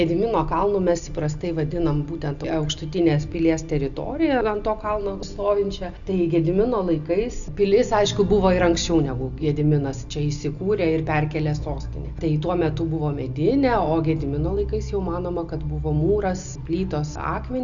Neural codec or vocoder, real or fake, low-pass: none; real; 7.2 kHz